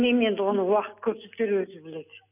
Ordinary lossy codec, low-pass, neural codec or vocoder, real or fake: none; 3.6 kHz; none; real